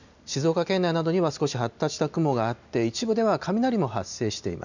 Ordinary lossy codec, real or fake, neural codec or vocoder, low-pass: none; real; none; 7.2 kHz